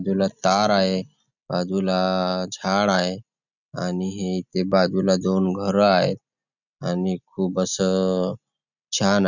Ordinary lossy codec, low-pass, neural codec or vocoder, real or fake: none; 7.2 kHz; none; real